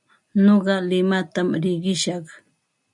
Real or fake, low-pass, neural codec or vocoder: real; 10.8 kHz; none